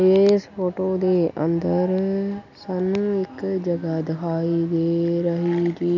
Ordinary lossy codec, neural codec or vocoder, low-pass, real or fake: none; none; 7.2 kHz; real